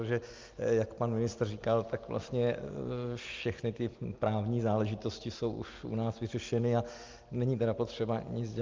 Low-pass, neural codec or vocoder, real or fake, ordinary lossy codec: 7.2 kHz; none; real; Opus, 32 kbps